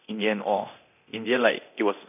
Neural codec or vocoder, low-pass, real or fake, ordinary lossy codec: codec, 24 kHz, 0.9 kbps, DualCodec; 3.6 kHz; fake; none